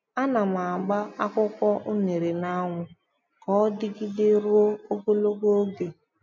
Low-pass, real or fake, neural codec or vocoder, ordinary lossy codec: 7.2 kHz; real; none; MP3, 48 kbps